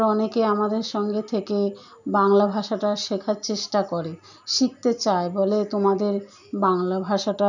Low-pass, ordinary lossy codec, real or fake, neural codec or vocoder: 7.2 kHz; none; real; none